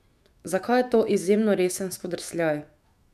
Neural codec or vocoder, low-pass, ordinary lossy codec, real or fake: autoencoder, 48 kHz, 128 numbers a frame, DAC-VAE, trained on Japanese speech; 14.4 kHz; none; fake